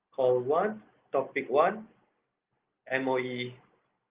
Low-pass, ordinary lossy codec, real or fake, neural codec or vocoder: 3.6 kHz; Opus, 32 kbps; real; none